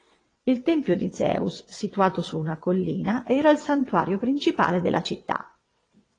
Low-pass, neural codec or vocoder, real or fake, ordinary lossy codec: 9.9 kHz; vocoder, 22.05 kHz, 80 mel bands, WaveNeXt; fake; AAC, 32 kbps